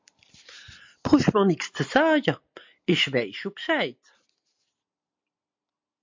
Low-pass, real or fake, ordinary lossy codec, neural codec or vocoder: 7.2 kHz; real; MP3, 48 kbps; none